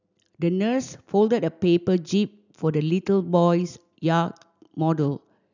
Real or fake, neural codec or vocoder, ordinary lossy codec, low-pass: real; none; none; 7.2 kHz